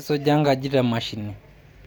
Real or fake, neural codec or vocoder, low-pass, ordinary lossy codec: real; none; none; none